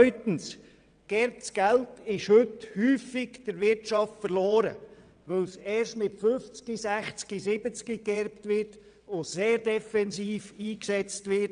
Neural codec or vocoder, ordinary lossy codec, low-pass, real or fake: vocoder, 22.05 kHz, 80 mel bands, WaveNeXt; none; 9.9 kHz; fake